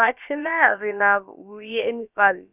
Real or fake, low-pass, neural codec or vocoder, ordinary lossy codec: fake; 3.6 kHz; codec, 16 kHz, about 1 kbps, DyCAST, with the encoder's durations; none